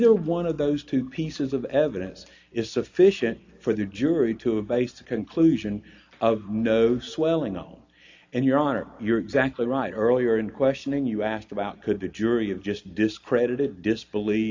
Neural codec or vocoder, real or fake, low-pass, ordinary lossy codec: none; real; 7.2 kHz; AAC, 48 kbps